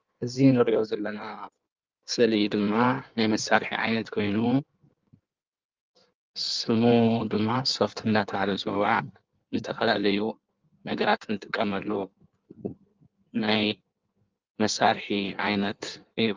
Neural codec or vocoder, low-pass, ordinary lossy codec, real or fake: codec, 16 kHz in and 24 kHz out, 1.1 kbps, FireRedTTS-2 codec; 7.2 kHz; Opus, 24 kbps; fake